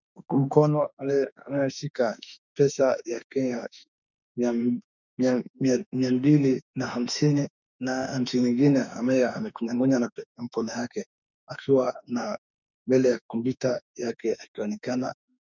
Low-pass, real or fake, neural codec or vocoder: 7.2 kHz; fake; autoencoder, 48 kHz, 32 numbers a frame, DAC-VAE, trained on Japanese speech